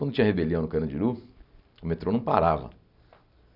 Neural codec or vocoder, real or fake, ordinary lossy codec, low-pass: none; real; none; 5.4 kHz